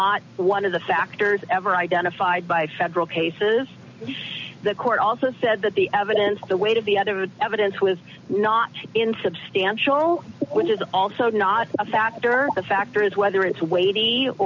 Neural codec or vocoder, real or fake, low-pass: none; real; 7.2 kHz